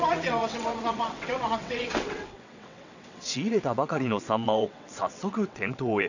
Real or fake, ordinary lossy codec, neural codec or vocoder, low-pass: fake; none; vocoder, 22.05 kHz, 80 mel bands, WaveNeXt; 7.2 kHz